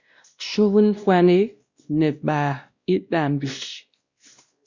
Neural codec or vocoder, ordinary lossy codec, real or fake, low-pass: codec, 16 kHz, 1 kbps, X-Codec, WavLM features, trained on Multilingual LibriSpeech; Opus, 64 kbps; fake; 7.2 kHz